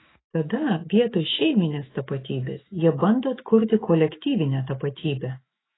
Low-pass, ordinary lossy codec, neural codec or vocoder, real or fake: 7.2 kHz; AAC, 16 kbps; autoencoder, 48 kHz, 128 numbers a frame, DAC-VAE, trained on Japanese speech; fake